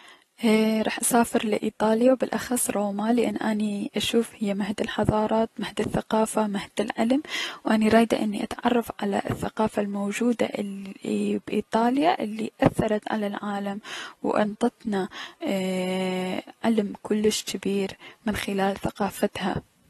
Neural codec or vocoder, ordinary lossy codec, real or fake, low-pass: none; AAC, 32 kbps; real; 19.8 kHz